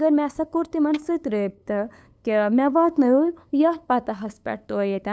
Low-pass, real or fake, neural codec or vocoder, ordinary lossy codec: none; fake; codec, 16 kHz, 8 kbps, FunCodec, trained on LibriTTS, 25 frames a second; none